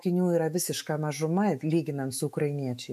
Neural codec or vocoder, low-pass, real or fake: autoencoder, 48 kHz, 128 numbers a frame, DAC-VAE, trained on Japanese speech; 14.4 kHz; fake